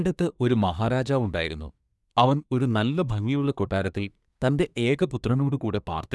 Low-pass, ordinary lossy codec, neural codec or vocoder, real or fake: none; none; codec, 24 kHz, 1 kbps, SNAC; fake